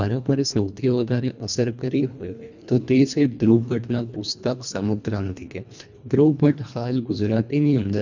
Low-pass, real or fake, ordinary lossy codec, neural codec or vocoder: 7.2 kHz; fake; none; codec, 24 kHz, 1.5 kbps, HILCodec